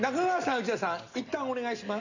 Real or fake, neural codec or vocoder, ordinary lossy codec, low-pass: real; none; none; 7.2 kHz